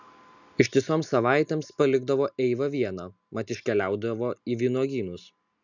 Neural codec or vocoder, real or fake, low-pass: none; real; 7.2 kHz